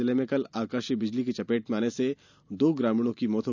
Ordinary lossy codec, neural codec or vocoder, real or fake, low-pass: none; none; real; 7.2 kHz